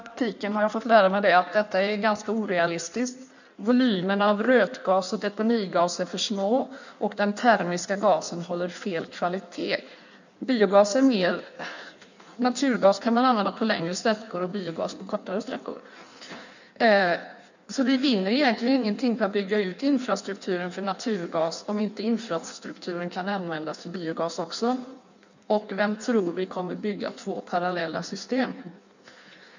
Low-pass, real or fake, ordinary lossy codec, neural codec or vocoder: 7.2 kHz; fake; none; codec, 16 kHz in and 24 kHz out, 1.1 kbps, FireRedTTS-2 codec